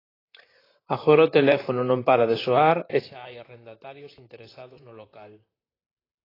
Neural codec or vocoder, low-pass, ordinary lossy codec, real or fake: vocoder, 44.1 kHz, 128 mel bands, Pupu-Vocoder; 5.4 kHz; AAC, 24 kbps; fake